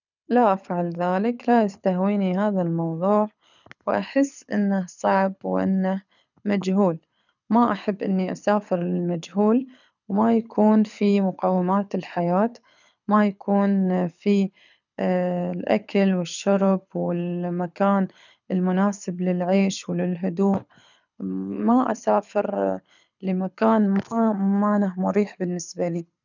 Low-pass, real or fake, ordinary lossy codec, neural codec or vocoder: 7.2 kHz; fake; none; codec, 24 kHz, 6 kbps, HILCodec